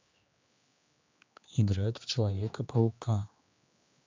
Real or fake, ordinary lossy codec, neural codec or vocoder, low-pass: fake; MP3, 64 kbps; codec, 16 kHz, 2 kbps, X-Codec, HuBERT features, trained on general audio; 7.2 kHz